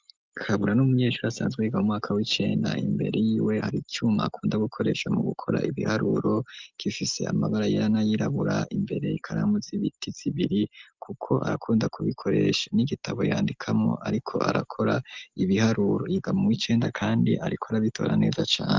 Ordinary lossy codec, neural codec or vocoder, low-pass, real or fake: Opus, 24 kbps; none; 7.2 kHz; real